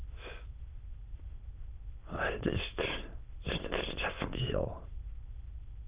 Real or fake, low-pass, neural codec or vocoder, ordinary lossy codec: fake; 3.6 kHz; autoencoder, 22.05 kHz, a latent of 192 numbers a frame, VITS, trained on many speakers; Opus, 24 kbps